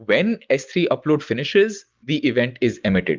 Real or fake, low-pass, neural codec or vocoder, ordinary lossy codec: real; 7.2 kHz; none; Opus, 32 kbps